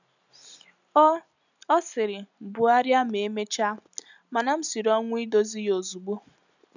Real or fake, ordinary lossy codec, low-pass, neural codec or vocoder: real; none; 7.2 kHz; none